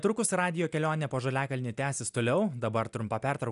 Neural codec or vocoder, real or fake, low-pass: none; real; 10.8 kHz